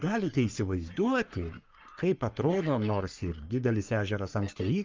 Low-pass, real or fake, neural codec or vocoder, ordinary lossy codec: 7.2 kHz; fake; codec, 44.1 kHz, 3.4 kbps, Pupu-Codec; Opus, 24 kbps